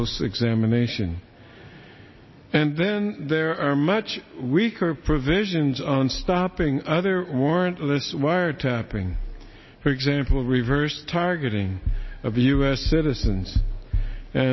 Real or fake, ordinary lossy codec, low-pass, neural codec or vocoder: real; MP3, 24 kbps; 7.2 kHz; none